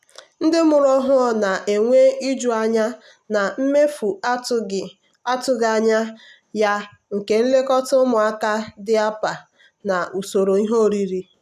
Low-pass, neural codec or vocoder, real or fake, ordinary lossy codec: 14.4 kHz; none; real; none